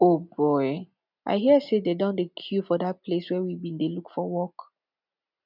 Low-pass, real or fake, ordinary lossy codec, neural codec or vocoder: 5.4 kHz; real; none; none